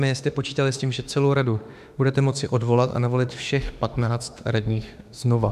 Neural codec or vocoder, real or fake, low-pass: autoencoder, 48 kHz, 32 numbers a frame, DAC-VAE, trained on Japanese speech; fake; 14.4 kHz